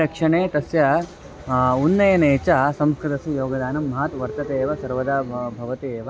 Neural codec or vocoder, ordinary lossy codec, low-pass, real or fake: none; none; none; real